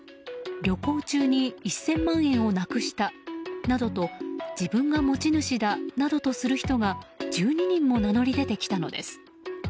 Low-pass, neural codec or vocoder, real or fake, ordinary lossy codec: none; none; real; none